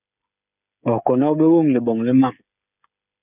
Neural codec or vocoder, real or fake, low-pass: codec, 16 kHz, 8 kbps, FreqCodec, smaller model; fake; 3.6 kHz